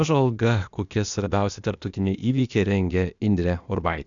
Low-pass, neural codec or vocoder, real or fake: 7.2 kHz; codec, 16 kHz, 0.8 kbps, ZipCodec; fake